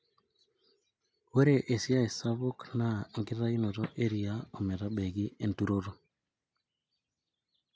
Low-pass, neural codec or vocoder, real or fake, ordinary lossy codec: none; none; real; none